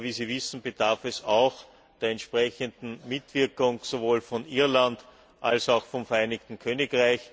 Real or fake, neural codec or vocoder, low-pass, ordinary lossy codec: real; none; none; none